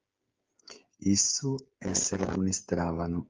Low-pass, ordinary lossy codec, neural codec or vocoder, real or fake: 7.2 kHz; Opus, 24 kbps; codec, 16 kHz, 8 kbps, FreqCodec, smaller model; fake